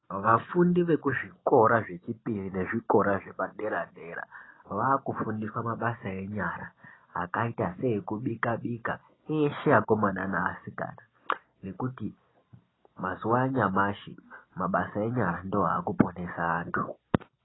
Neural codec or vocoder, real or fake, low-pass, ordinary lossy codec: none; real; 7.2 kHz; AAC, 16 kbps